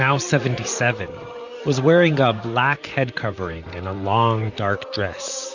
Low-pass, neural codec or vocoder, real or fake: 7.2 kHz; vocoder, 44.1 kHz, 128 mel bands, Pupu-Vocoder; fake